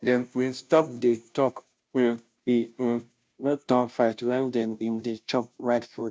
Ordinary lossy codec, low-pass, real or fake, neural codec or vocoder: none; none; fake; codec, 16 kHz, 0.5 kbps, FunCodec, trained on Chinese and English, 25 frames a second